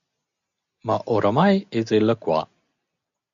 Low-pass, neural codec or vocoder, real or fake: 7.2 kHz; none; real